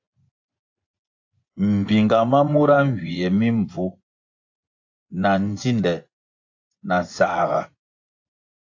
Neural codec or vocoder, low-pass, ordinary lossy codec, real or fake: vocoder, 24 kHz, 100 mel bands, Vocos; 7.2 kHz; AAC, 48 kbps; fake